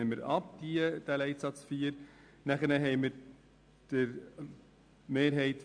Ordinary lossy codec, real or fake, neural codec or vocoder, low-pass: AAC, 64 kbps; real; none; 9.9 kHz